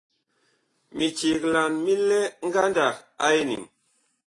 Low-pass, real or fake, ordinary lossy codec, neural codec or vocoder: 10.8 kHz; real; AAC, 32 kbps; none